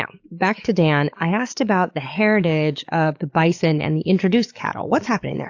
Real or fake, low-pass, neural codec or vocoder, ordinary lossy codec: fake; 7.2 kHz; codec, 44.1 kHz, 7.8 kbps, DAC; AAC, 48 kbps